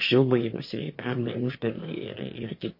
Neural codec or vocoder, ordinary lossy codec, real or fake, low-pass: autoencoder, 22.05 kHz, a latent of 192 numbers a frame, VITS, trained on one speaker; MP3, 32 kbps; fake; 5.4 kHz